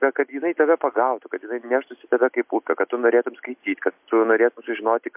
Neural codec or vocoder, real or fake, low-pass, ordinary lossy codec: none; real; 3.6 kHz; MP3, 32 kbps